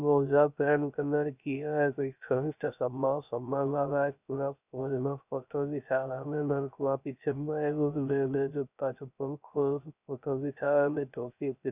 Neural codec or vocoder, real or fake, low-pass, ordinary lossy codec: codec, 16 kHz, 0.3 kbps, FocalCodec; fake; 3.6 kHz; none